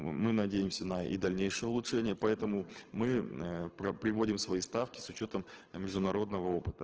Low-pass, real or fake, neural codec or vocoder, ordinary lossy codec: 7.2 kHz; fake; codec, 24 kHz, 6 kbps, HILCodec; Opus, 16 kbps